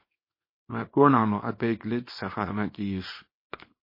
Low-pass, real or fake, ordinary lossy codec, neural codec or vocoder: 5.4 kHz; fake; MP3, 24 kbps; codec, 24 kHz, 0.9 kbps, WavTokenizer, small release